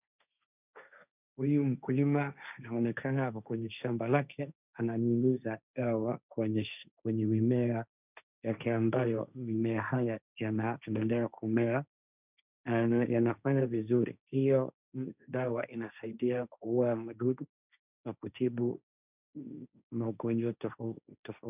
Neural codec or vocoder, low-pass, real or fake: codec, 16 kHz, 1.1 kbps, Voila-Tokenizer; 3.6 kHz; fake